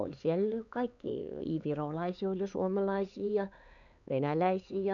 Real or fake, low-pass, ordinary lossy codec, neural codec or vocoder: fake; 7.2 kHz; none; codec, 16 kHz, 4 kbps, X-Codec, HuBERT features, trained on LibriSpeech